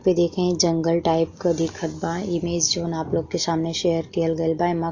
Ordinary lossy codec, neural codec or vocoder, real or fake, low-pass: AAC, 48 kbps; none; real; 7.2 kHz